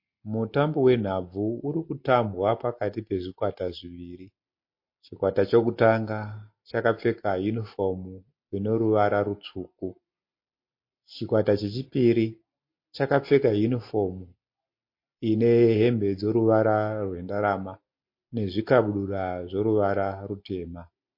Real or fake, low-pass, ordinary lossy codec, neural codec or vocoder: real; 5.4 kHz; MP3, 32 kbps; none